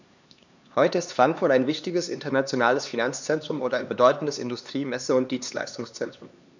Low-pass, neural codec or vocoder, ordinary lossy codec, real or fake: 7.2 kHz; codec, 16 kHz, 2 kbps, X-Codec, HuBERT features, trained on LibriSpeech; none; fake